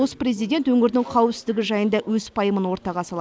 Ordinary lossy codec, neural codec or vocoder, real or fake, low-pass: none; none; real; none